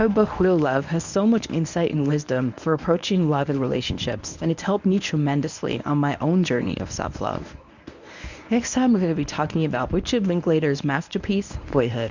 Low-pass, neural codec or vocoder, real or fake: 7.2 kHz; codec, 24 kHz, 0.9 kbps, WavTokenizer, medium speech release version 1; fake